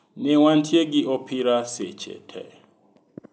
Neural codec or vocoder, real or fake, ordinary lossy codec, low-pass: none; real; none; none